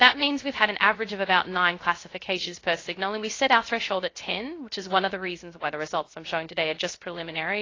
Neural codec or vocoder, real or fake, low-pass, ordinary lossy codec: codec, 16 kHz, 0.3 kbps, FocalCodec; fake; 7.2 kHz; AAC, 32 kbps